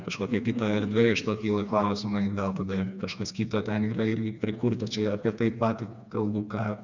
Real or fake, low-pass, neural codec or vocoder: fake; 7.2 kHz; codec, 16 kHz, 2 kbps, FreqCodec, smaller model